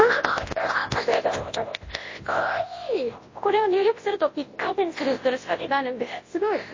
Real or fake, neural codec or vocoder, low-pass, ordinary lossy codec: fake; codec, 24 kHz, 0.9 kbps, WavTokenizer, large speech release; 7.2 kHz; MP3, 32 kbps